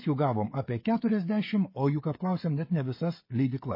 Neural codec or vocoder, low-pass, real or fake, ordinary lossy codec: codec, 16 kHz, 16 kbps, FreqCodec, smaller model; 5.4 kHz; fake; MP3, 24 kbps